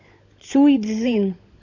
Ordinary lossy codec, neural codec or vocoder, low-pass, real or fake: AAC, 48 kbps; codec, 16 kHz, 16 kbps, FunCodec, trained on LibriTTS, 50 frames a second; 7.2 kHz; fake